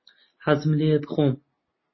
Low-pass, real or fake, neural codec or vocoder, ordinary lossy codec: 7.2 kHz; real; none; MP3, 24 kbps